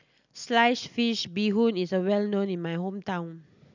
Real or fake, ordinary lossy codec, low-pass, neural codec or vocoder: real; none; 7.2 kHz; none